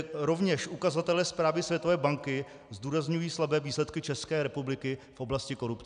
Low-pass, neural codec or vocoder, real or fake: 9.9 kHz; none; real